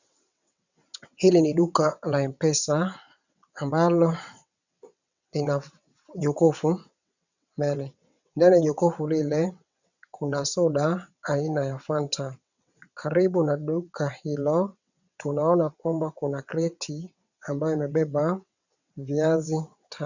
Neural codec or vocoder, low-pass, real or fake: vocoder, 22.05 kHz, 80 mel bands, WaveNeXt; 7.2 kHz; fake